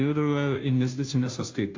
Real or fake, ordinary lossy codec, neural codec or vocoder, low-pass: fake; AAC, 48 kbps; codec, 16 kHz, 0.5 kbps, FunCodec, trained on Chinese and English, 25 frames a second; 7.2 kHz